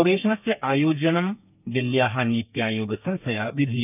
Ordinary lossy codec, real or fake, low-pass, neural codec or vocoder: none; fake; 3.6 kHz; codec, 32 kHz, 1.9 kbps, SNAC